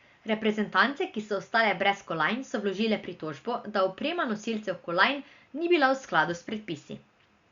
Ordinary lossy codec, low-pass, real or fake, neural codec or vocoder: Opus, 64 kbps; 7.2 kHz; real; none